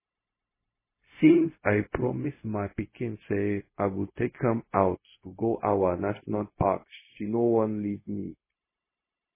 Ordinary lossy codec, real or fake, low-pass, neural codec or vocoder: MP3, 16 kbps; fake; 3.6 kHz; codec, 16 kHz, 0.4 kbps, LongCat-Audio-Codec